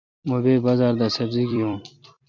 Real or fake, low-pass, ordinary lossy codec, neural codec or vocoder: fake; 7.2 kHz; MP3, 64 kbps; vocoder, 44.1 kHz, 128 mel bands every 256 samples, BigVGAN v2